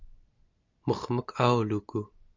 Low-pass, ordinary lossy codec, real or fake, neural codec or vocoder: 7.2 kHz; MP3, 64 kbps; real; none